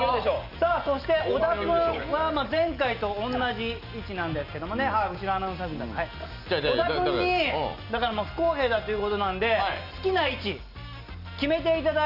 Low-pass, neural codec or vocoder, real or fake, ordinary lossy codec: 5.4 kHz; none; real; none